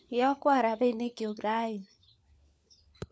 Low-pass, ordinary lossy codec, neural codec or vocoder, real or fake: none; none; codec, 16 kHz, 4 kbps, FunCodec, trained on LibriTTS, 50 frames a second; fake